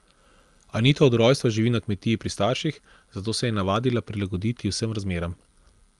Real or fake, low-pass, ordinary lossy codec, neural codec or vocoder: real; 10.8 kHz; Opus, 32 kbps; none